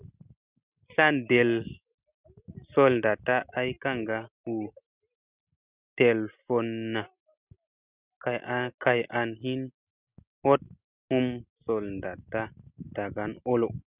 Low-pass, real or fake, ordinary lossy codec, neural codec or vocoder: 3.6 kHz; real; Opus, 64 kbps; none